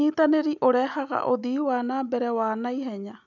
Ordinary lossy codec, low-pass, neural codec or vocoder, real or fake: none; 7.2 kHz; none; real